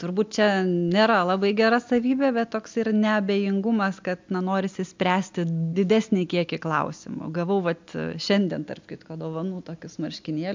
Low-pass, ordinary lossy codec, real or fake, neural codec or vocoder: 7.2 kHz; MP3, 64 kbps; real; none